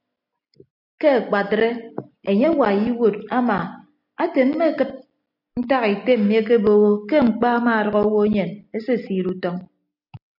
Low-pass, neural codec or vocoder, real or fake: 5.4 kHz; none; real